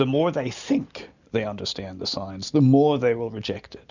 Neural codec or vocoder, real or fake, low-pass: none; real; 7.2 kHz